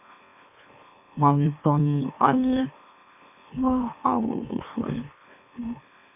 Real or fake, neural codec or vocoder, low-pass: fake; autoencoder, 44.1 kHz, a latent of 192 numbers a frame, MeloTTS; 3.6 kHz